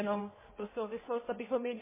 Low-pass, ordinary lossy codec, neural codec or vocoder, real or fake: 3.6 kHz; MP3, 16 kbps; codec, 16 kHz in and 24 kHz out, 1.1 kbps, FireRedTTS-2 codec; fake